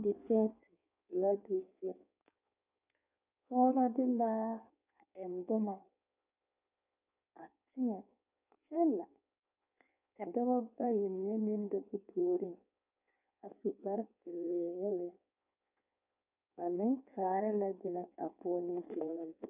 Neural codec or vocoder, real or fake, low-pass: codec, 16 kHz in and 24 kHz out, 1.1 kbps, FireRedTTS-2 codec; fake; 3.6 kHz